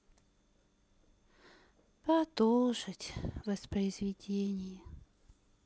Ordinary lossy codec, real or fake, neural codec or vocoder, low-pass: none; real; none; none